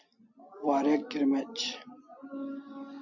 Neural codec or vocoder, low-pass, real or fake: none; 7.2 kHz; real